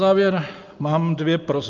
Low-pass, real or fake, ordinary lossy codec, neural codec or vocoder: 7.2 kHz; real; Opus, 24 kbps; none